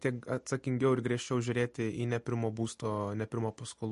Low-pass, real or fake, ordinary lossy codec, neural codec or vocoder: 14.4 kHz; real; MP3, 48 kbps; none